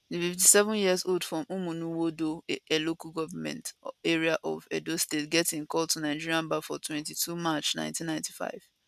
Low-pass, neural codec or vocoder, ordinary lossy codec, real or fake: 14.4 kHz; none; none; real